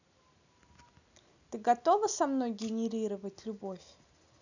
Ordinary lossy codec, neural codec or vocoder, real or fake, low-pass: none; none; real; 7.2 kHz